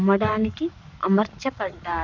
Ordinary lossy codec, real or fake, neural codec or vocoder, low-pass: none; fake; codec, 44.1 kHz, 7.8 kbps, Pupu-Codec; 7.2 kHz